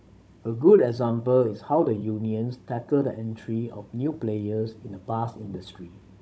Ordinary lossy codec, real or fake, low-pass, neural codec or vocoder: none; fake; none; codec, 16 kHz, 16 kbps, FunCodec, trained on Chinese and English, 50 frames a second